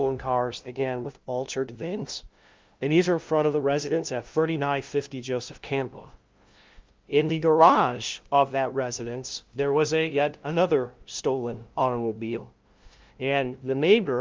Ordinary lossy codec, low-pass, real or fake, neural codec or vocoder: Opus, 32 kbps; 7.2 kHz; fake; codec, 16 kHz, 0.5 kbps, FunCodec, trained on LibriTTS, 25 frames a second